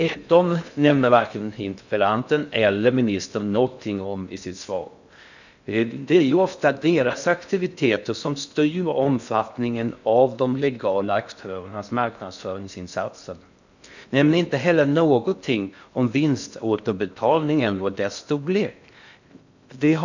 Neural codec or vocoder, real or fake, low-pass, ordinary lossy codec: codec, 16 kHz in and 24 kHz out, 0.6 kbps, FocalCodec, streaming, 2048 codes; fake; 7.2 kHz; none